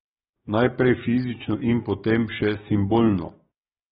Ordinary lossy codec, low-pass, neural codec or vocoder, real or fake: AAC, 16 kbps; 19.8 kHz; codec, 44.1 kHz, 7.8 kbps, DAC; fake